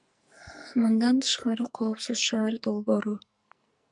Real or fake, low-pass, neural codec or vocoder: fake; 10.8 kHz; codec, 44.1 kHz, 2.6 kbps, SNAC